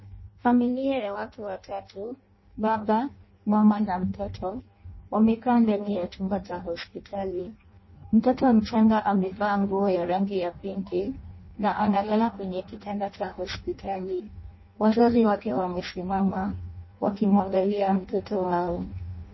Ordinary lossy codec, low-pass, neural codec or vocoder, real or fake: MP3, 24 kbps; 7.2 kHz; codec, 16 kHz in and 24 kHz out, 0.6 kbps, FireRedTTS-2 codec; fake